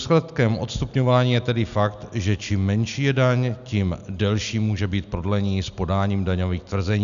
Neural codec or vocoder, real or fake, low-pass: none; real; 7.2 kHz